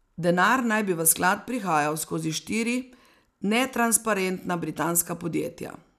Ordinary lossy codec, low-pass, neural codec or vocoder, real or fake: none; 14.4 kHz; none; real